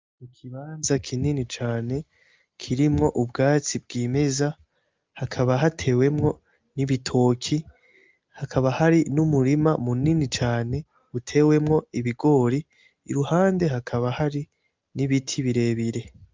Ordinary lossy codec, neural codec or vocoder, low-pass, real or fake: Opus, 32 kbps; none; 7.2 kHz; real